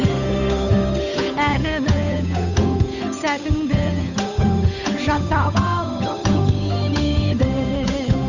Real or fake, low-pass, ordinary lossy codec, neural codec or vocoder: fake; 7.2 kHz; none; codec, 16 kHz, 8 kbps, FunCodec, trained on Chinese and English, 25 frames a second